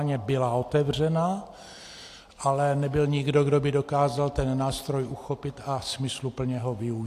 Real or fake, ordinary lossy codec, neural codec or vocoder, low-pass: real; AAC, 64 kbps; none; 14.4 kHz